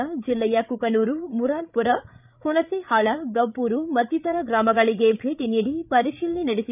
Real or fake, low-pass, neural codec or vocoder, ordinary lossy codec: fake; 3.6 kHz; codec, 16 kHz, 8 kbps, FreqCodec, larger model; none